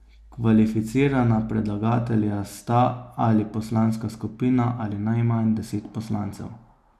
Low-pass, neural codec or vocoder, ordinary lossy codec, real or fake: 14.4 kHz; none; none; real